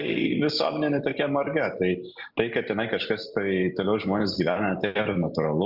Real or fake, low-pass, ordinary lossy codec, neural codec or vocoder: real; 5.4 kHz; Opus, 64 kbps; none